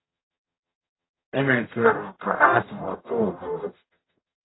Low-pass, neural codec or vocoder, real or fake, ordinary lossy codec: 7.2 kHz; codec, 44.1 kHz, 0.9 kbps, DAC; fake; AAC, 16 kbps